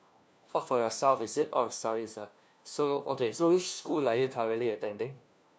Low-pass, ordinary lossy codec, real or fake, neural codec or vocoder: none; none; fake; codec, 16 kHz, 1 kbps, FunCodec, trained on LibriTTS, 50 frames a second